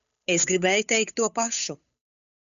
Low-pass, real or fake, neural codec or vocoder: 7.2 kHz; fake; codec, 16 kHz, 8 kbps, FunCodec, trained on Chinese and English, 25 frames a second